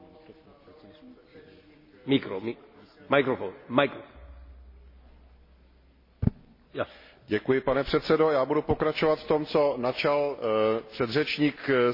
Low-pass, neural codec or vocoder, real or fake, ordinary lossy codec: 5.4 kHz; none; real; MP3, 24 kbps